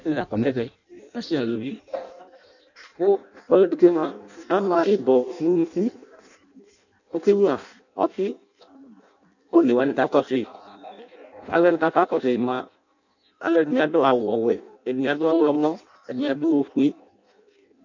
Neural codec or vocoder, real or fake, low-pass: codec, 16 kHz in and 24 kHz out, 0.6 kbps, FireRedTTS-2 codec; fake; 7.2 kHz